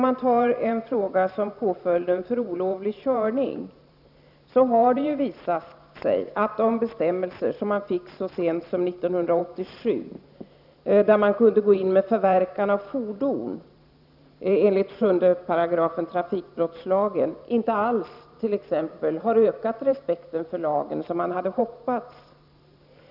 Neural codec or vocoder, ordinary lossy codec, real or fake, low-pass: vocoder, 44.1 kHz, 128 mel bands every 512 samples, BigVGAN v2; none; fake; 5.4 kHz